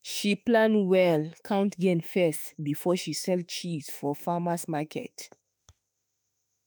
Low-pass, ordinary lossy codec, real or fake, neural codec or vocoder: none; none; fake; autoencoder, 48 kHz, 32 numbers a frame, DAC-VAE, trained on Japanese speech